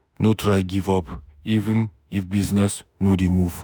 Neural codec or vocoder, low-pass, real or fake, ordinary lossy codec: autoencoder, 48 kHz, 32 numbers a frame, DAC-VAE, trained on Japanese speech; none; fake; none